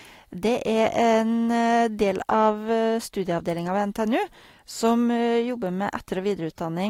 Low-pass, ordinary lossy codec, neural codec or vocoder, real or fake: 19.8 kHz; AAC, 48 kbps; none; real